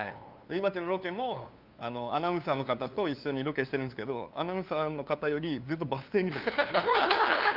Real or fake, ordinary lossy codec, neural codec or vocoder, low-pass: fake; Opus, 24 kbps; codec, 16 kHz, 2 kbps, FunCodec, trained on LibriTTS, 25 frames a second; 5.4 kHz